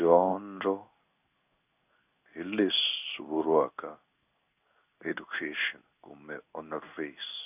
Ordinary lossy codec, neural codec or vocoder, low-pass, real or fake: none; codec, 16 kHz in and 24 kHz out, 1 kbps, XY-Tokenizer; 3.6 kHz; fake